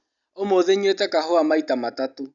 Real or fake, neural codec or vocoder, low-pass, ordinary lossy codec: real; none; 7.2 kHz; none